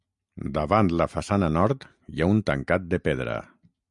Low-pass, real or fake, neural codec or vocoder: 10.8 kHz; real; none